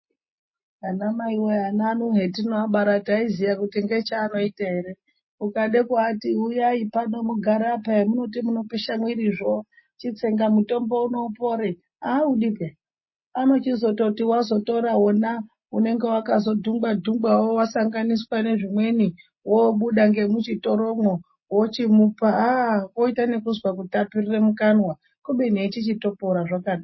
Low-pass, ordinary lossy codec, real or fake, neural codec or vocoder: 7.2 kHz; MP3, 24 kbps; real; none